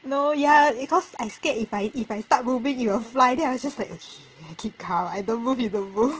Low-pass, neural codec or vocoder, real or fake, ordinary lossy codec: 7.2 kHz; none; real; Opus, 16 kbps